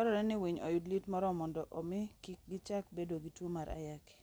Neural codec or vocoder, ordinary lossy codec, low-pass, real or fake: none; none; none; real